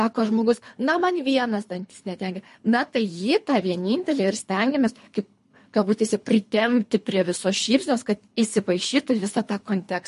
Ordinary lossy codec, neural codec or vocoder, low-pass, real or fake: MP3, 48 kbps; codec, 24 kHz, 3 kbps, HILCodec; 10.8 kHz; fake